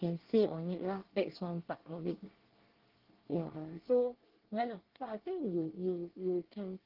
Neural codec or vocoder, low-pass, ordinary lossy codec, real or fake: codec, 24 kHz, 1 kbps, SNAC; 5.4 kHz; Opus, 16 kbps; fake